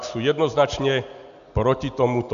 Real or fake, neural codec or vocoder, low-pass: real; none; 7.2 kHz